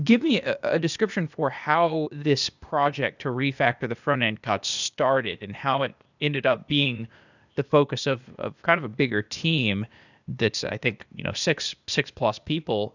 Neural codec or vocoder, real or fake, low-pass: codec, 16 kHz, 0.8 kbps, ZipCodec; fake; 7.2 kHz